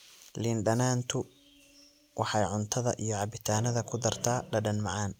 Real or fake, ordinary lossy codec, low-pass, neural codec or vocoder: real; none; 19.8 kHz; none